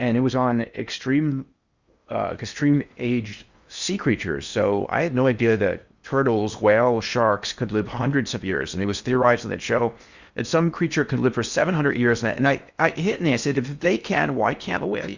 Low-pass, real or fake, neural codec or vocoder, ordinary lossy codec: 7.2 kHz; fake; codec, 16 kHz in and 24 kHz out, 0.8 kbps, FocalCodec, streaming, 65536 codes; Opus, 64 kbps